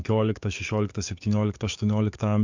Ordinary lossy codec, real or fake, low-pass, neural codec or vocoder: MP3, 64 kbps; fake; 7.2 kHz; codec, 44.1 kHz, 7.8 kbps, DAC